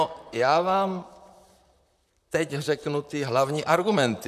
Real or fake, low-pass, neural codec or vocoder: fake; 14.4 kHz; vocoder, 44.1 kHz, 128 mel bands, Pupu-Vocoder